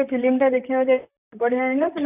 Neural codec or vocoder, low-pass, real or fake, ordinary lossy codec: vocoder, 44.1 kHz, 128 mel bands, Pupu-Vocoder; 3.6 kHz; fake; none